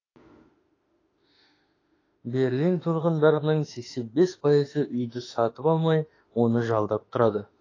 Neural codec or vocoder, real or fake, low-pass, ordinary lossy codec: autoencoder, 48 kHz, 32 numbers a frame, DAC-VAE, trained on Japanese speech; fake; 7.2 kHz; AAC, 32 kbps